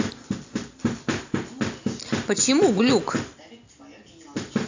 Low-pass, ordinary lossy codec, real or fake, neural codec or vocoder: 7.2 kHz; AAC, 48 kbps; real; none